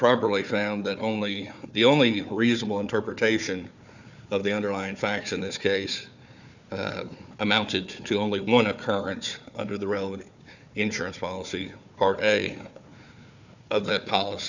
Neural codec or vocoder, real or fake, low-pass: codec, 16 kHz, 4 kbps, FunCodec, trained on Chinese and English, 50 frames a second; fake; 7.2 kHz